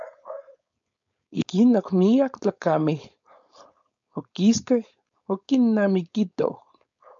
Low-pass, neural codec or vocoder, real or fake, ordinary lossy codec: 7.2 kHz; codec, 16 kHz, 4.8 kbps, FACodec; fake; MP3, 96 kbps